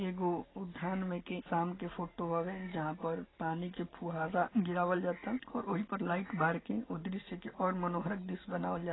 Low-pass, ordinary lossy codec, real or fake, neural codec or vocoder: 7.2 kHz; AAC, 16 kbps; real; none